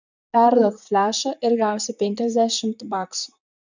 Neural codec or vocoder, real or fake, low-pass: vocoder, 44.1 kHz, 128 mel bands, Pupu-Vocoder; fake; 7.2 kHz